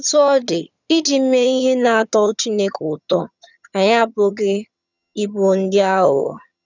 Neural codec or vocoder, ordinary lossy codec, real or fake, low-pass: vocoder, 22.05 kHz, 80 mel bands, HiFi-GAN; none; fake; 7.2 kHz